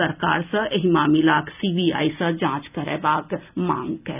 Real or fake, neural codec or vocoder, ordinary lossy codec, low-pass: real; none; none; 3.6 kHz